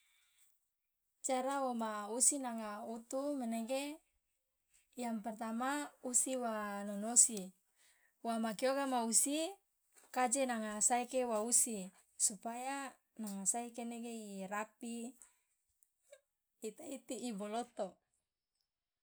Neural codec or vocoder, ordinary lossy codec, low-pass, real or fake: none; none; none; real